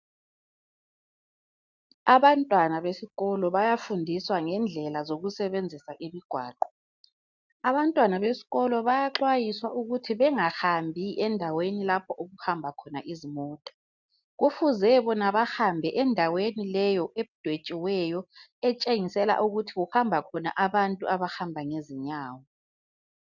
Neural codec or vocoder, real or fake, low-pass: none; real; 7.2 kHz